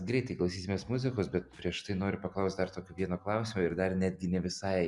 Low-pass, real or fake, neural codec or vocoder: 10.8 kHz; real; none